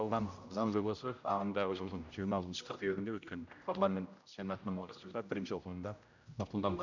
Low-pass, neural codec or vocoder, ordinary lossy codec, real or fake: 7.2 kHz; codec, 16 kHz, 0.5 kbps, X-Codec, HuBERT features, trained on general audio; none; fake